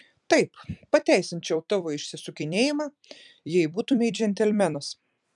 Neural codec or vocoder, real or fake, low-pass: vocoder, 44.1 kHz, 128 mel bands every 256 samples, BigVGAN v2; fake; 10.8 kHz